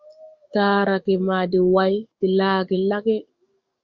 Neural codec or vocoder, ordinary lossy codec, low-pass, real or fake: codec, 44.1 kHz, 7.8 kbps, DAC; Opus, 64 kbps; 7.2 kHz; fake